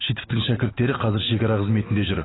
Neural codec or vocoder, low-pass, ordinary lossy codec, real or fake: none; 7.2 kHz; AAC, 16 kbps; real